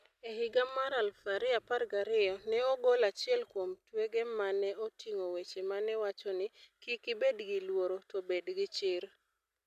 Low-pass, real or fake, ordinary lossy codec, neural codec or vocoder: 14.4 kHz; real; none; none